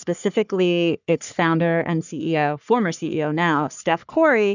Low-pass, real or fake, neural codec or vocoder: 7.2 kHz; fake; codec, 44.1 kHz, 3.4 kbps, Pupu-Codec